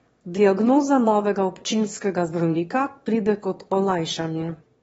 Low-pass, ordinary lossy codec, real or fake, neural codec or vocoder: 9.9 kHz; AAC, 24 kbps; fake; autoencoder, 22.05 kHz, a latent of 192 numbers a frame, VITS, trained on one speaker